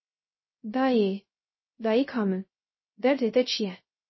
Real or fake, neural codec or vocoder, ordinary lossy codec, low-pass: fake; codec, 16 kHz, 0.3 kbps, FocalCodec; MP3, 24 kbps; 7.2 kHz